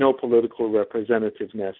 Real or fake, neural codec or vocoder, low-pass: real; none; 5.4 kHz